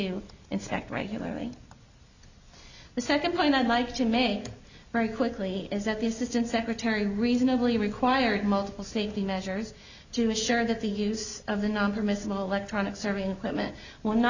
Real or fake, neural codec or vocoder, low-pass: real; none; 7.2 kHz